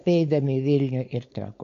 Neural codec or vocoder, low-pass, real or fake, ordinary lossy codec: codec, 16 kHz, 4.8 kbps, FACodec; 7.2 kHz; fake; MP3, 48 kbps